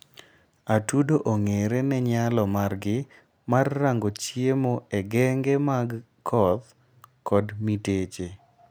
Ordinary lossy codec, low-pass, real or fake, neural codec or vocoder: none; none; real; none